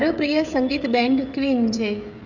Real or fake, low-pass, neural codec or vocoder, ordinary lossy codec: fake; 7.2 kHz; codec, 16 kHz, 16 kbps, FreqCodec, smaller model; none